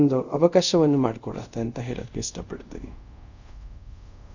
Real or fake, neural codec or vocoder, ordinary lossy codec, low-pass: fake; codec, 24 kHz, 0.5 kbps, DualCodec; none; 7.2 kHz